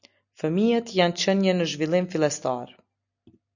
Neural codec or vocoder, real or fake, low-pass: none; real; 7.2 kHz